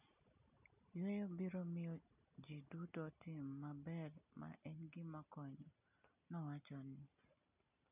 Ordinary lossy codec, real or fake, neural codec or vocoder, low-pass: none; real; none; 3.6 kHz